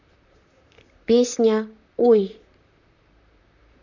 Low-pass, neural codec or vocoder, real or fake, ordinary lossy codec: 7.2 kHz; vocoder, 44.1 kHz, 128 mel bands, Pupu-Vocoder; fake; none